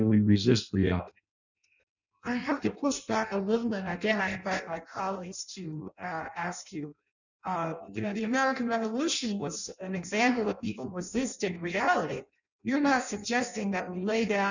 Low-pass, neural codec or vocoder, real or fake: 7.2 kHz; codec, 16 kHz in and 24 kHz out, 0.6 kbps, FireRedTTS-2 codec; fake